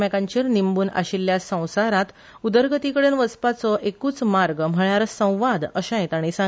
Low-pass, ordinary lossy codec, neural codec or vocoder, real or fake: none; none; none; real